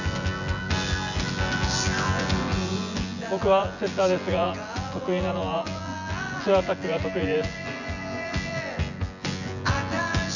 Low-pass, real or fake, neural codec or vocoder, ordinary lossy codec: 7.2 kHz; fake; vocoder, 24 kHz, 100 mel bands, Vocos; none